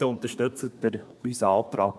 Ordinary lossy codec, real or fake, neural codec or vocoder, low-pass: none; fake; codec, 24 kHz, 1 kbps, SNAC; none